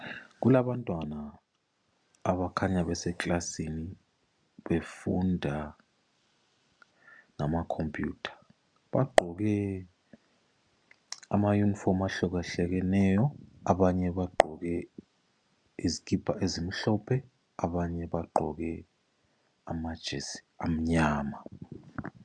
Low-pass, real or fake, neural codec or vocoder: 9.9 kHz; real; none